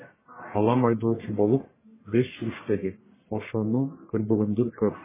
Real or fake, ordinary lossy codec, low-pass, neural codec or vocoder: fake; MP3, 16 kbps; 3.6 kHz; codec, 44.1 kHz, 1.7 kbps, Pupu-Codec